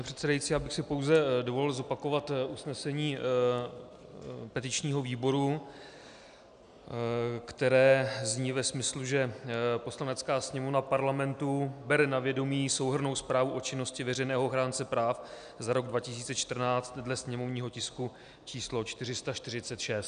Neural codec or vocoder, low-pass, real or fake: none; 9.9 kHz; real